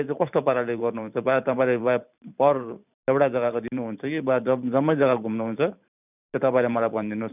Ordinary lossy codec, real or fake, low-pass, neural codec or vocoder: none; fake; 3.6 kHz; vocoder, 44.1 kHz, 128 mel bands every 512 samples, BigVGAN v2